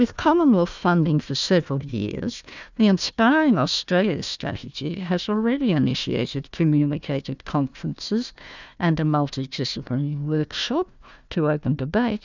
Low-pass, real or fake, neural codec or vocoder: 7.2 kHz; fake; codec, 16 kHz, 1 kbps, FunCodec, trained on Chinese and English, 50 frames a second